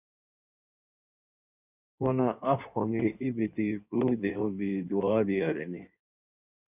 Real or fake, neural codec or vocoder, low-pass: fake; codec, 16 kHz in and 24 kHz out, 1.1 kbps, FireRedTTS-2 codec; 3.6 kHz